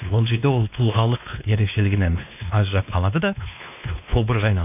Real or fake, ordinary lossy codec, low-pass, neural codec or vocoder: fake; none; 3.6 kHz; codec, 16 kHz, 2 kbps, X-Codec, WavLM features, trained on Multilingual LibriSpeech